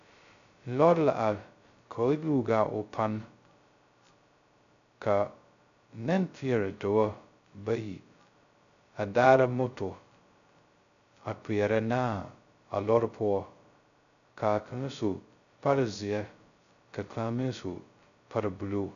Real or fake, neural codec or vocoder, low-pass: fake; codec, 16 kHz, 0.2 kbps, FocalCodec; 7.2 kHz